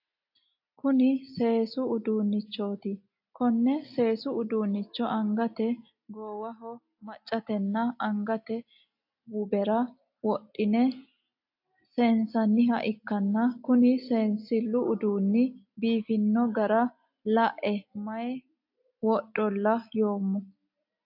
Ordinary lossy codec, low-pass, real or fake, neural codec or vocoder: AAC, 48 kbps; 5.4 kHz; real; none